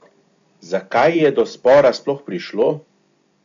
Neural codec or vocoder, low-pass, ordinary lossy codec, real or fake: none; 7.2 kHz; AAC, 64 kbps; real